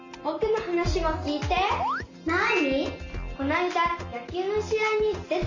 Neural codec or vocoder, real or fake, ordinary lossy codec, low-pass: none; real; MP3, 48 kbps; 7.2 kHz